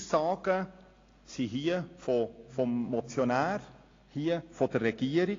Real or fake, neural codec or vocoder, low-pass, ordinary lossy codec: real; none; 7.2 kHz; AAC, 32 kbps